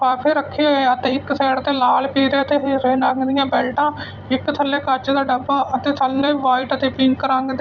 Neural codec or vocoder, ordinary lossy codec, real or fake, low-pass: vocoder, 44.1 kHz, 128 mel bands every 256 samples, BigVGAN v2; none; fake; 7.2 kHz